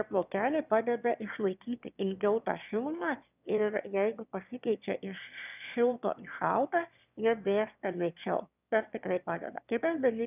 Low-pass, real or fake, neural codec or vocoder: 3.6 kHz; fake; autoencoder, 22.05 kHz, a latent of 192 numbers a frame, VITS, trained on one speaker